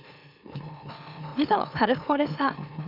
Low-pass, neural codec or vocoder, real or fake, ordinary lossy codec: 5.4 kHz; autoencoder, 44.1 kHz, a latent of 192 numbers a frame, MeloTTS; fake; none